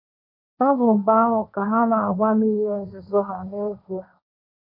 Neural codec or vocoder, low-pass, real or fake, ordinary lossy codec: codec, 16 kHz, 1.1 kbps, Voila-Tokenizer; 5.4 kHz; fake; none